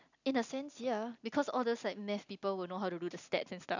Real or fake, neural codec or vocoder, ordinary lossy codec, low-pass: real; none; none; 7.2 kHz